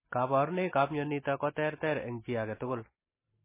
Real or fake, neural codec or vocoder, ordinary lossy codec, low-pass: real; none; MP3, 16 kbps; 3.6 kHz